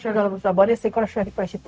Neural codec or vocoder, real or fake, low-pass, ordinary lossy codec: codec, 16 kHz, 0.4 kbps, LongCat-Audio-Codec; fake; none; none